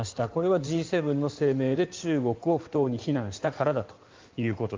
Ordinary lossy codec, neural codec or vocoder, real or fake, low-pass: Opus, 24 kbps; codec, 16 kHz in and 24 kHz out, 2.2 kbps, FireRedTTS-2 codec; fake; 7.2 kHz